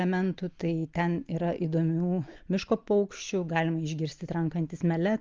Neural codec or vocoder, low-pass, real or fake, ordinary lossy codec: none; 7.2 kHz; real; Opus, 24 kbps